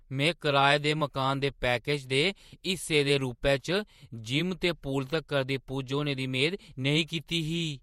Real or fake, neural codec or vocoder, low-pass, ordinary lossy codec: fake; vocoder, 48 kHz, 128 mel bands, Vocos; 19.8 kHz; MP3, 64 kbps